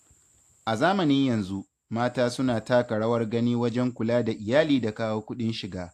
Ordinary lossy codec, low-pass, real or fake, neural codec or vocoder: AAC, 64 kbps; 14.4 kHz; real; none